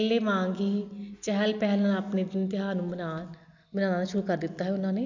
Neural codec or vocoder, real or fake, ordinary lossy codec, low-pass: none; real; none; 7.2 kHz